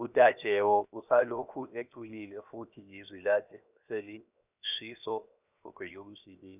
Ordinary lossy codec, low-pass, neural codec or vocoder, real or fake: none; 3.6 kHz; codec, 16 kHz, 0.7 kbps, FocalCodec; fake